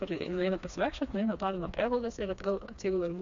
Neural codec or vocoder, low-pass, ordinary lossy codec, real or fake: codec, 16 kHz, 2 kbps, FreqCodec, smaller model; 7.2 kHz; AAC, 64 kbps; fake